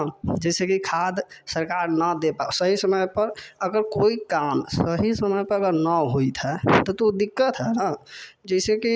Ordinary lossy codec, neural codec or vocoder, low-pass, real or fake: none; none; none; real